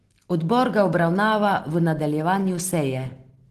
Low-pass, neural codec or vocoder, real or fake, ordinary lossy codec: 14.4 kHz; none; real; Opus, 16 kbps